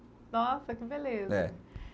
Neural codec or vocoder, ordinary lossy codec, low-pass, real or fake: none; none; none; real